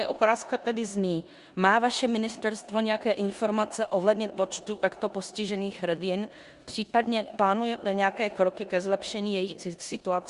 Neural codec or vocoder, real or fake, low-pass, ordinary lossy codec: codec, 16 kHz in and 24 kHz out, 0.9 kbps, LongCat-Audio-Codec, four codebook decoder; fake; 10.8 kHz; Opus, 64 kbps